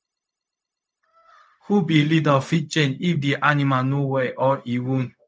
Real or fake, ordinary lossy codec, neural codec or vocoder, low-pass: fake; none; codec, 16 kHz, 0.4 kbps, LongCat-Audio-Codec; none